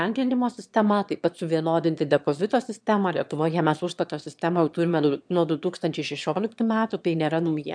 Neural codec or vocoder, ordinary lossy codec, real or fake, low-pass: autoencoder, 22.05 kHz, a latent of 192 numbers a frame, VITS, trained on one speaker; MP3, 96 kbps; fake; 9.9 kHz